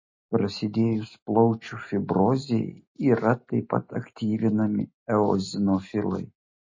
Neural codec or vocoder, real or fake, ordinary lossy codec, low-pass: none; real; MP3, 32 kbps; 7.2 kHz